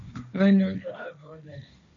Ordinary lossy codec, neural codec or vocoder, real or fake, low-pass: MP3, 64 kbps; codec, 16 kHz, 1.1 kbps, Voila-Tokenizer; fake; 7.2 kHz